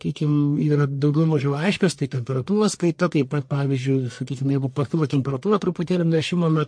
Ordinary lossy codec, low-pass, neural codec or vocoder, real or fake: MP3, 48 kbps; 9.9 kHz; codec, 44.1 kHz, 1.7 kbps, Pupu-Codec; fake